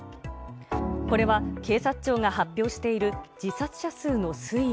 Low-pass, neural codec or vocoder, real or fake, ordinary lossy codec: none; none; real; none